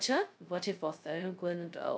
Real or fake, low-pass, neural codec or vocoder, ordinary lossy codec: fake; none; codec, 16 kHz, 0.2 kbps, FocalCodec; none